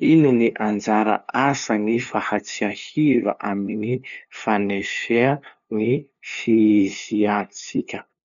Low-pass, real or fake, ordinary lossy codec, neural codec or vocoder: 7.2 kHz; fake; none; codec, 16 kHz, 2 kbps, FunCodec, trained on LibriTTS, 25 frames a second